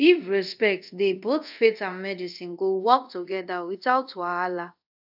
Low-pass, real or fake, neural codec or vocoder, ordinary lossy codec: 5.4 kHz; fake; codec, 24 kHz, 0.5 kbps, DualCodec; AAC, 48 kbps